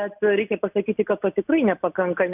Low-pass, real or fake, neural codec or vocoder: 3.6 kHz; real; none